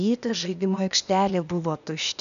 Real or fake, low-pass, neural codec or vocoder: fake; 7.2 kHz; codec, 16 kHz, 0.8 kbps, ZipCodec